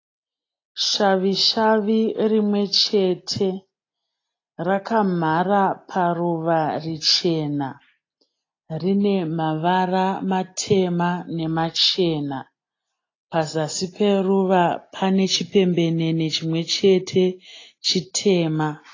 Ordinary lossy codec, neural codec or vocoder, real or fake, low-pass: AAC, 32 kbps; none; real; 7.2 kHz